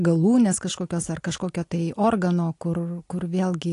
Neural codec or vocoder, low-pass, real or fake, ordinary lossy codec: none; 10.8 kHz; real; AAC, 48 kbps